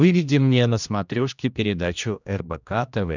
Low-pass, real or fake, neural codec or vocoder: 7.2 kHz; fake; codec, 16 kHz, 1 kbps, X-Codec, HuBERT features, trained on general audio